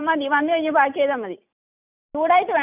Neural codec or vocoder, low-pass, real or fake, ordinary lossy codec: none; 3.6 kHz; real; none